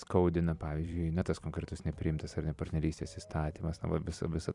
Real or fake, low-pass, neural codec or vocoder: fake; 10.8 kHz; vocoder, 44.1 kHz, 128 mel bands every 256 samples, BigVGAN v2